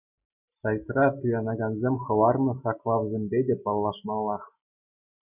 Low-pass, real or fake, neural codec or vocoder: 3.6 kHz; real; none